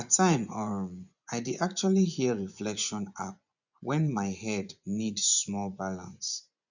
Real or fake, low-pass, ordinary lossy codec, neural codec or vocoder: fake; 7.2 kHz; none; vocoder, 24 kHz, 100 mel bands, Vocos